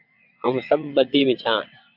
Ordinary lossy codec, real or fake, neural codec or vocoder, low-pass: AAC, 48 kbps; fake; vocoder, 22.05 kHz, 80 mel bands, WaveNeXt; 5.4 kHz